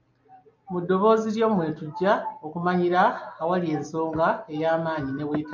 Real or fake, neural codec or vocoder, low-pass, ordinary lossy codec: real; none; 7.2 kHz; MP3, 48 kbps